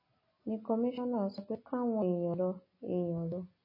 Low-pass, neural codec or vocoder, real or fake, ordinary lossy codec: 5.4 kHz; none; real; MP3, 24 kbps